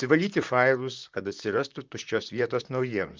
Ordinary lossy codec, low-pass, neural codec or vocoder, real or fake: Opus, 24 kbps; 7.2 kHz; vocoder, 44.1 kHz, 128 mel bands, Pupu-Vocoder; fake